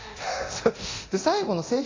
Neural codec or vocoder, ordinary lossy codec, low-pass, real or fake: codec, 24 kHz, 0.9 kbps, DualCodec; AAC, 32 kbps; 7.2 kHz; fake